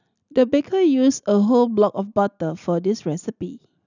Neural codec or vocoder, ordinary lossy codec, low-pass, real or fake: none; none; 7.2 kHz; real